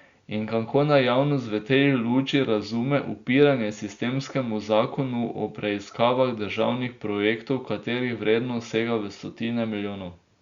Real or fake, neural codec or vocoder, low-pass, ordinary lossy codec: real; none; 7.2 kHz; Opus, 64 kbps